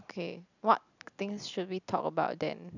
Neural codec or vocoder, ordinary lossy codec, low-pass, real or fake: none; none; 7.2 kHz; real